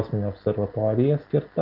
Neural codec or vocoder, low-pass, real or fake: none; 5.4 kHz; real